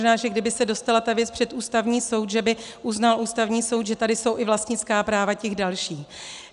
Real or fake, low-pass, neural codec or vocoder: real; 10.8 kHz; none